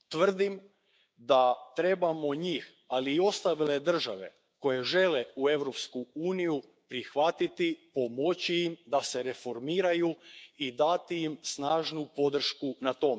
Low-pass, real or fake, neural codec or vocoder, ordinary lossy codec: none; fake; codec, 16 kHz, 6 kbps, DAC; none